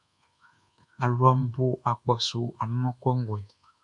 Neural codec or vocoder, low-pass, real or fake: codec, 24 kHz, 1.2 kbps, DualCodec; 10.8 kHz; fake